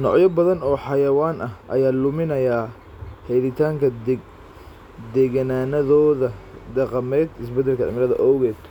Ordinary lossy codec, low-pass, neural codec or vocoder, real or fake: none; 19.8 kHz; none; real